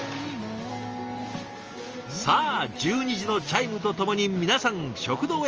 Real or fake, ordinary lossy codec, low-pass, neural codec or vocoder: real; Opus, 24 kbps; 7.2 kHz; none